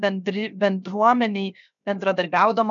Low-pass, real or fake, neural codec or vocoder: 7.2 kHz; fake; codec, 16 kHz, 0.7 kbps, FocalCodec